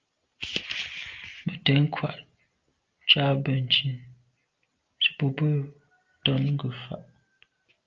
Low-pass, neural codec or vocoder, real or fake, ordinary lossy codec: 7.2 kHz; none; real; Opus, 24 kbps